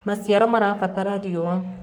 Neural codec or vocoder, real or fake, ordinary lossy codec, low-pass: codec, 44.1 kHz, 3.4 kbps, Pupu-Codec; fake; none; none